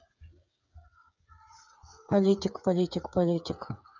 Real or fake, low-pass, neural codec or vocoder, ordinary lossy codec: fake; 7.2 kHz; codec, 16 kHz, 8 kbps, FreqCodec, smaller model; none